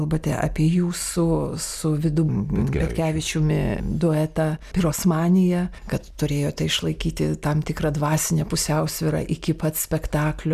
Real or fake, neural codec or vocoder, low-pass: real; none; 14.4 kHz